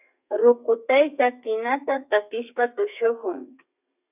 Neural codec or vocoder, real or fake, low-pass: codec, 32 kHz, 1.9 kbps, SNAC; fake; 3.6 kHz